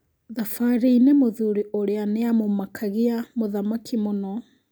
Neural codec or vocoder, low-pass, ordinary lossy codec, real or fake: none; none; none; real